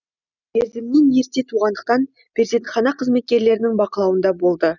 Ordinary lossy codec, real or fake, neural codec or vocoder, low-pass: none; real; none; 7.2 kHz